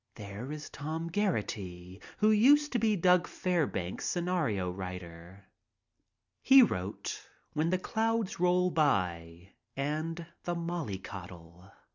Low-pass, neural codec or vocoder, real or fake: 7.2 kHz; none; real